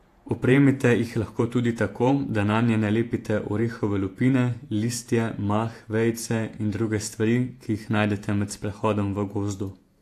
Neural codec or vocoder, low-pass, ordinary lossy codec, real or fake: vocoder, 48 kHz, 128 mel bands, Vocos; 14.4 kHz; AAC, 64 kbps; fake